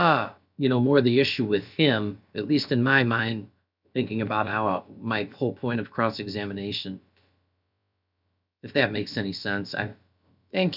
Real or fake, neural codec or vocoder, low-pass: fake; codec, 16 kHz, about 1 kbps, DyCAST, with the encoder's durations; 5.4 kHz